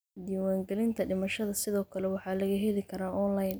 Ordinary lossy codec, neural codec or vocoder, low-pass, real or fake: none; none; none; real